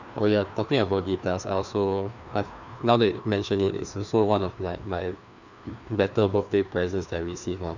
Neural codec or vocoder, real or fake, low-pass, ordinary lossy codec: codec, 16 kHz, 2 kbps, FreqCodec, larger model; fake; 7.2 kHz; none